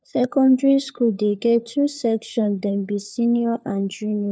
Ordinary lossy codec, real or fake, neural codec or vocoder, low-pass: none; fake; codec, 16 kHz, 4 kbps, FunCodec, trained on LibriTTS, 50 frames a second; none